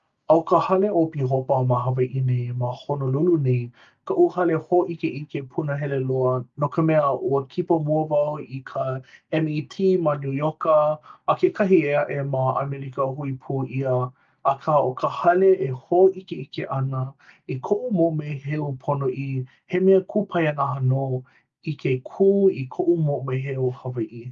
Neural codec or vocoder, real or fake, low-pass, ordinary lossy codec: none; real; 7.2 kHz; Opus, 32 kbps